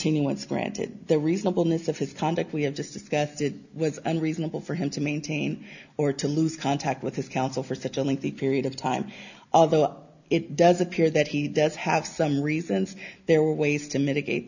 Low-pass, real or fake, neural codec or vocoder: 7.2 kHz; real; none